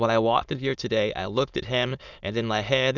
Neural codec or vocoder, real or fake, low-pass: autoencoder, 22.05 kHz, a latent of 192 numbers a frame, VITS, trained on many speakers; fake; 7.2 kHz